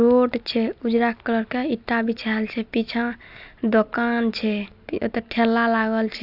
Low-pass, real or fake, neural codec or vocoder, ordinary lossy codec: 5.4 kHz; real; none; AAC, 48 kbps